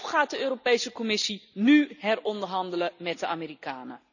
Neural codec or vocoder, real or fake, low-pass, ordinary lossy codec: none; real; 7.2 kHz; none